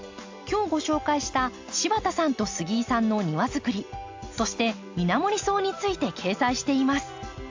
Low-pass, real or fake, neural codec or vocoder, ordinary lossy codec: 7.2 kHz; real; none; AAC, 48 kbps